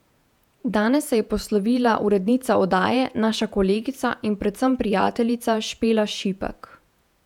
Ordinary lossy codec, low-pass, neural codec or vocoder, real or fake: none; 19.8 kHz; vocoder, 48 kHz, 128 mel bands, Vocos; fake